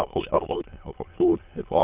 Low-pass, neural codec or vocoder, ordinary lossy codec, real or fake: 3.6 kHz; autoencoder, 22.05 kHz, a latent of 192 numbers a frame, VITS, trained on many speakers; Opus, 32 kbps; fake